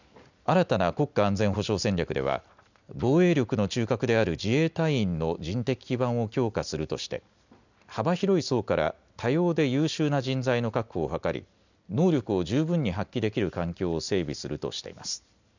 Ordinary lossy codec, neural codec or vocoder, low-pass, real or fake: none; none; 7.2 kHz; real